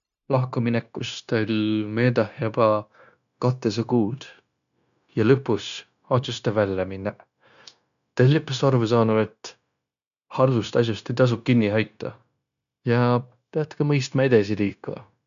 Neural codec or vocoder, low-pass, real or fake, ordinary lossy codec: codec, 16 kHz, 0.9 kbps, LongCat-Audio-Codec; 7.2 kHz; fake; none